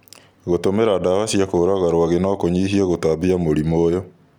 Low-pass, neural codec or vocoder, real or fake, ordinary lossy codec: 19.8 kHz; none; real; none